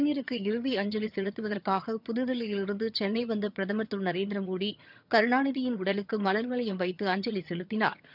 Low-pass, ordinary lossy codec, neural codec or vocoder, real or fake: 5.4 kHz; none; vocoder, 22.05 kHz, 80 mel bands, HiFi-GAN; fake